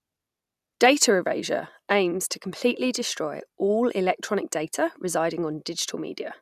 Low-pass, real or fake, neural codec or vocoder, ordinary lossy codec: 14.4 kHz; real; none; none